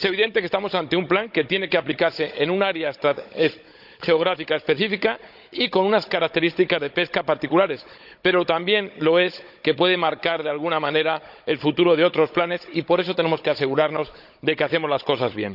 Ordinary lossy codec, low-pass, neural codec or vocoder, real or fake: none; 5.4 kHz; codec, 16 kHz, 16 kbps, FunCodec, trained on Chinese and English, 50 frames a second; fake